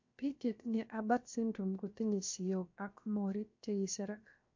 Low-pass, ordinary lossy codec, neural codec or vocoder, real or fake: 7.2 kHz; MP3, 48 kbps; codec, 16 kHz, 0.7 kbps, FocalCodec; fake